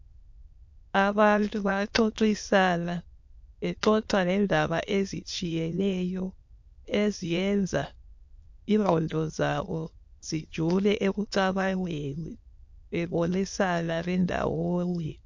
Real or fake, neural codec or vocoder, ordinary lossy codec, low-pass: fake; autoencoder, 22.05 kHz, a latent of 192 numbers a frame, VITS, trained on many speakers; MP3, 48 kbps; 7.2 kHz